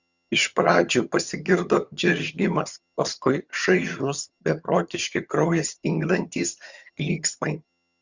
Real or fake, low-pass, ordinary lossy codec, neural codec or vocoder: fake; 7.2 kHz; Opus, 64 kbps; vocoder, 22.05 kHz, 80 mel bands, HiFi-GAN